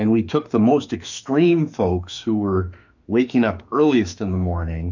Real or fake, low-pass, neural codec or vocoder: fake; 7.2 kHz; autoencoder, 48 kHz, 32 numbers a frame, DAC-VAE, trained on Japanese speech